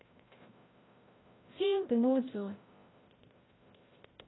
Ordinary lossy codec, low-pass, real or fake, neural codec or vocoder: AAC, 16 kbps; 7.2 kHz; fake; codec, 16 kHz, 0.5 kbps, FreqCodec, larger model